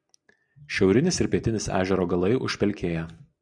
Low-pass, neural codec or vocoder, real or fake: 9.9 kHz; none; real